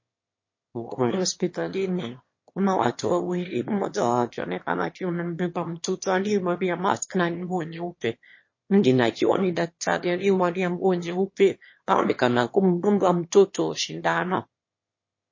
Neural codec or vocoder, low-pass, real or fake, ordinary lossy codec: autoencoder, 22.05 kHz, a latent of 192 numbers a frame, VITS, trained on one speaker; 7.2 kHz; fake; MP3, 32 kbps